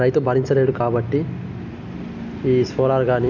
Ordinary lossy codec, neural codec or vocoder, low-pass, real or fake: none; none; 7.2 kHz; real